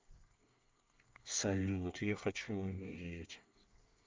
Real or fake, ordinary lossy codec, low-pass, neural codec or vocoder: fake; Opus, 24 kbps; 7.2 kHz; codec, 24 kHz, 1 kbps, SNAC